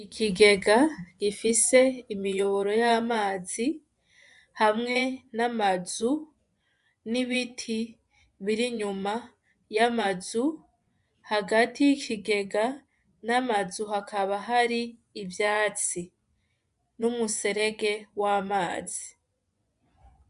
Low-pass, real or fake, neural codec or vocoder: 10.8 kHz; fake; vocoder, 24 kHz, 100 mel bands, Vocos